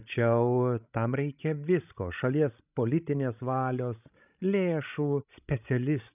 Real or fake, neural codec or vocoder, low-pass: fake; codec, 16 kHz, 16 kbps, FreqCodec, larger model; 3.6 kHz